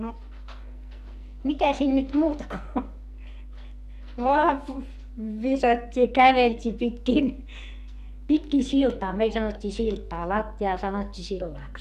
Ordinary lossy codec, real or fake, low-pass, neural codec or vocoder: MP3, 96 kbps; fake; 14.4 kHz; codec, 44.1 kHz, 2.6 kbps, SNAC